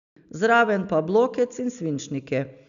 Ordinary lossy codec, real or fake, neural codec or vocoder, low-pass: none; real; none; 7.2 kHz